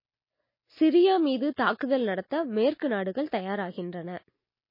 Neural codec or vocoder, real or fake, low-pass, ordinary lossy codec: none; real; 5.4 kHz; MP3, 24 kbps